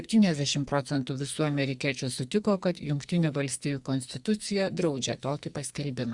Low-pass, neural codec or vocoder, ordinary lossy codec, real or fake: 10.8 kHz; codec, 44.1 kHz, 2.6 kbps, SNAC; Opus, 64 kbps; fake